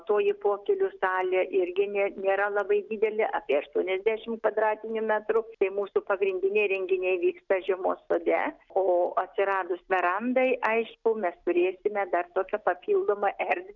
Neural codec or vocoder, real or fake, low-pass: none; real; 7.2 kHz